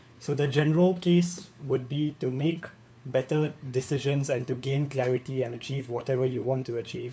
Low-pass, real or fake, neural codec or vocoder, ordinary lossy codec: none; fake; codec, 16 kHz, 4 kbps, FunCodec, trained on LibriTTS, 50 frames a second; none